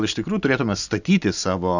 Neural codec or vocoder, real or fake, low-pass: none; real; 7.2 kHz